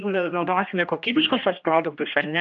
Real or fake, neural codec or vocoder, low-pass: fake; codec, 16 kHz, 1 kbps, X-Codec, HuBERT features, trained on general audio; 7.2 kHz